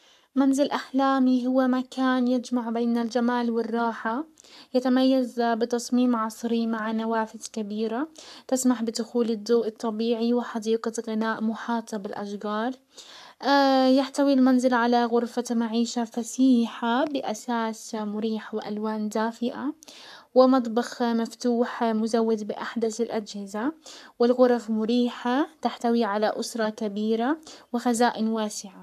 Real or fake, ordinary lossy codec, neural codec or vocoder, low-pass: fake; AAC, 96 kbps; codec, 44.1 kHz, 7.8 kbps, Pupu-Codec; 14.4 kHz